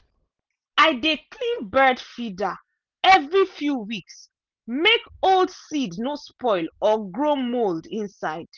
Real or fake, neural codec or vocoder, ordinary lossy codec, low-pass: real; none; Opus, 24 kbps; 7.2 kHz